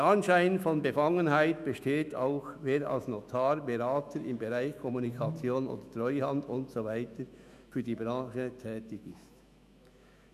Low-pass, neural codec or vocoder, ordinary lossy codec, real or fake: 14.4 kHz; autoencoder, 48 kHz, 128 numbers a frame, DAC-VAE, trained on Japanese speech; none; fake